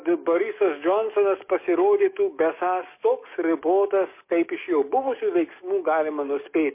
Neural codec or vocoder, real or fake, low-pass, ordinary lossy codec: codec, 44.1 kHz, 7.8 kbps, DAC; fake; 3.6 kHz; MP3, 24 kbps